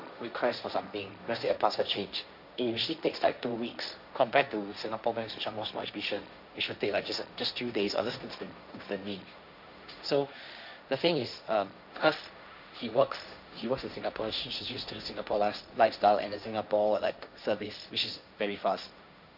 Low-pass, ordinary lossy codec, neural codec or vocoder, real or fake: 5.4 kHz; none; codec, 16 kHz, 1.1 kbps, Voila-Tokenizer; fake